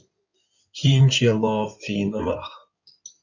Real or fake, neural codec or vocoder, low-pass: fake; codec, 16 kHz in and 24 kHz out, 2.2 kbps, FireRedTTS-2 codec; 7.2 kHz